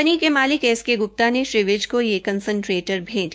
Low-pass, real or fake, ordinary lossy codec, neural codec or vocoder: none; fake; none; codec, 16 kHz, 6 kbps, DAC